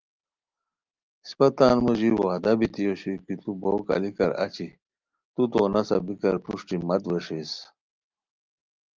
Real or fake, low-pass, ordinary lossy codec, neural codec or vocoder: real; 7.2 kHz; Opus, 32 kbps; none